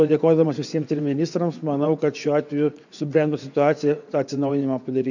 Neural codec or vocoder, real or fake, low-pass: vocoder, 24 kHz, 100 mel bands, Vocos; fake; 7.2 kHz